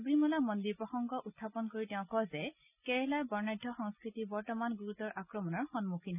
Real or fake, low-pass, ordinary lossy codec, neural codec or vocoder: real; 3.6 kHz; none; none